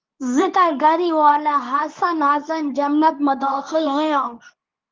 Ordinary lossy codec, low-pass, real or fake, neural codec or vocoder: Opus, 32 kbps; 7.2 kHz; fake; codec, 24 kHz, 0.9 kbps, WavTokenizer, medium speech release version 2